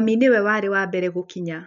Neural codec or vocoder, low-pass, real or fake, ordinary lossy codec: none; 7.2 kHz; real; MP3, 64 kbps